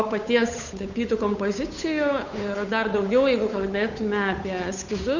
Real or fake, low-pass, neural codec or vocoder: fake; 7.2 kHz; codec, 16 kHz, 8 kbps, FunCodec, trained on Chinese and English, 25 frames a second